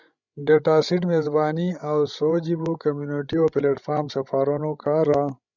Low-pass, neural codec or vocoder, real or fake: 7.2 kHz; codec, 16 kHz, 16 kbps, FreqCodec, larger model; fake